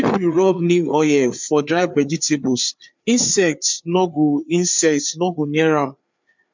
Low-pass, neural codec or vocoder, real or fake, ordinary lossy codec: 7.2 kHz; codec, 16 kHz, 4 kbps, FreqCodec, larger model; fake; MP3, 64 kbps